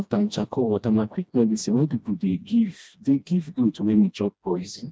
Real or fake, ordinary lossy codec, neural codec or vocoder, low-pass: fake; none; codec, 16 kHz, 1 kbps, FreqCodec, smaller model; none